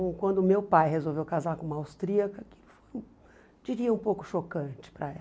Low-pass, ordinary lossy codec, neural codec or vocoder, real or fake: none; none; none; real